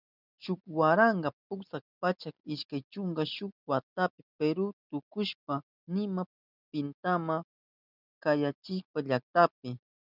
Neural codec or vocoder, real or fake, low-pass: none; real; 5.4 kHz